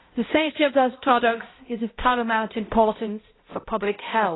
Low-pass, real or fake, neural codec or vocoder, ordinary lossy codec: 7.2 kHz; fake; codec, 16 kHz, 0.5 kbps, X-Codec, HuBERT features, trained on balanced general audio; AAC, 16 kbps